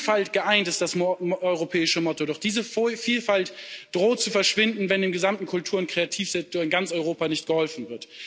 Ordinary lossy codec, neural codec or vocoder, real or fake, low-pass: none; none; real; none